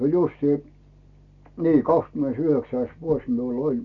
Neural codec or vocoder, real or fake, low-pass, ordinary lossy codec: none; real; 7.2 kHz; none